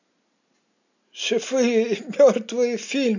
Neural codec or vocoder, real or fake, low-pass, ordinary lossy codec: none; real; 7.2 kHz; none